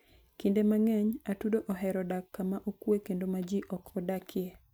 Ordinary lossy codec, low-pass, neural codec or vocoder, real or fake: none; none; none; real